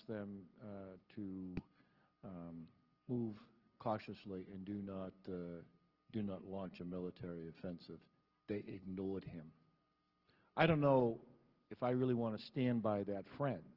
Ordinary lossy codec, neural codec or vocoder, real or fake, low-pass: Opus, 16 kbps; none; real; 5.4 kHz